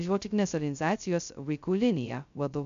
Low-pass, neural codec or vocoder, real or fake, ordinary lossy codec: 7.2 kHz; codec, 16 kHz, 0.2 kbps, FocalCodec; fake; MP3, 64 kbps